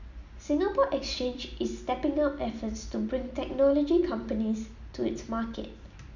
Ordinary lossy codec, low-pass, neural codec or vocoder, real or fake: none; 7.2 kHz; none; real